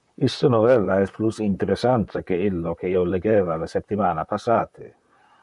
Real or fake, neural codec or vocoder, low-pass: fake; vocoder, 44.1 kHz, 128 mel bands, Pupu-Vocoder; 10.8 kHz